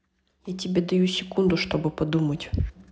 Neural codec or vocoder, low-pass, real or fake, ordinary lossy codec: none; none; real; none